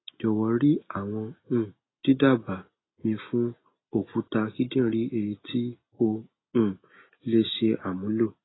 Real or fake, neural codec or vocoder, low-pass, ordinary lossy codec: real; none; 7.2 kHz; AAC, 16 kbps